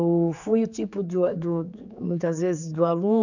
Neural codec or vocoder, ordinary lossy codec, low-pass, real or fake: codec, 16 kHz, 4 kbps, X-Codec, HuBERT features, trained on general audio; none; 7.2 kHz; fake